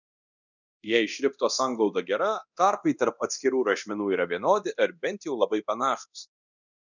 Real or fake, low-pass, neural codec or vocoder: fake; 7.2 kHz; codec, 24 kHz, 0.9 kbps, DualCodec